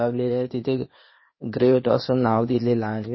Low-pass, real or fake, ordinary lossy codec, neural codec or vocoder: 7.2 kHz; fake; MP3, 24 kbps; codec, 16 kHz, 0.8 kbps, ZipCodec